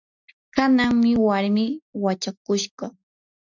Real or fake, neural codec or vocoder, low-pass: real; none; 7.2 kHz